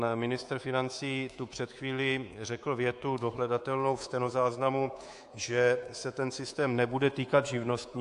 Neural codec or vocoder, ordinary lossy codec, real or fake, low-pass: codec, 24 kHz, 3.1 kbps, DualCodec; AAC, 64 kbps; fake; 10.8 kHz